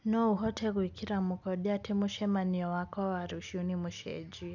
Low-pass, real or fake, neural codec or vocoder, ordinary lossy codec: 7.2 kHz; real; none; none